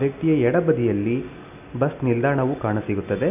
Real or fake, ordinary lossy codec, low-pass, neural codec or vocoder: real; none; 3.6 kHz; none